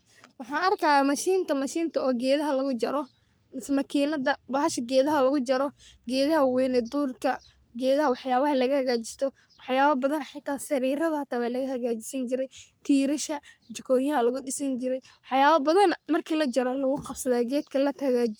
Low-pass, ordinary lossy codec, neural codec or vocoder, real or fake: none; none; codec, 44.1 kHz, 3.4 kbps, Pupu-Codec; fake